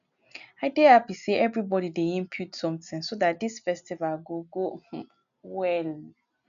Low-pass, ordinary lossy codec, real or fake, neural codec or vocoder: 7.2 kHz; none; real; none